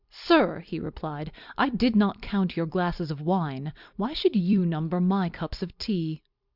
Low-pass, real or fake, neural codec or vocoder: 5.4 kHz; fake; vocoder, 44.1 kHz, 128 mel bands every 256 samples, BigVGAN v2